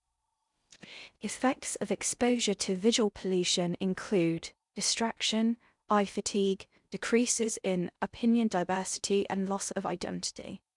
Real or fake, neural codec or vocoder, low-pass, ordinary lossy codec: fake; codec, 16 kHz in and 24 kHz out, 0.6 kbps, FocalCodec, streaming, 4096 codes; 10.8 kHz; none